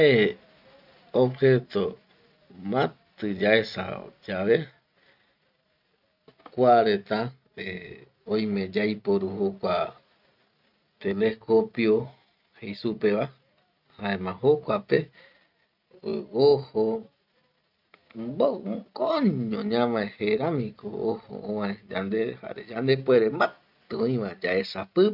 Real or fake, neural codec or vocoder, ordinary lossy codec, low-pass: real; none; none; 5.4 kHz